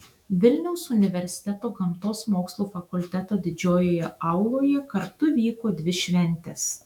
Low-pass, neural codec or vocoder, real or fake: 19.8 kHz; autoencoder, 48 kHz, 128 numbers a frame, DAC-VAE, trained on Japanese speech; fake